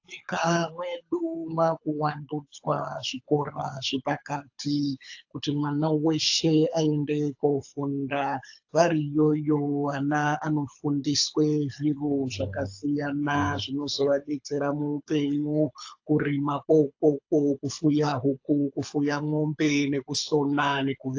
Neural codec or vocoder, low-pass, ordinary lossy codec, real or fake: codec, 24 kHz, 6 kbps, HILCodec; 7.2 kHz; AAC, 48 kbps; fake